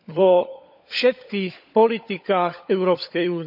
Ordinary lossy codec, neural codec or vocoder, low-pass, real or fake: none; codec, 16 kHz, 16 kbps, FunCodec, trained on LibriTTS, 50 frames a second; 5.4 kHz; fake